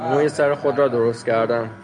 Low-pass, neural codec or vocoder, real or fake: 9.9 kHz; none; real